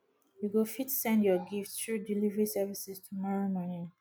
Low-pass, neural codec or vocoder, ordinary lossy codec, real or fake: none; none; none; real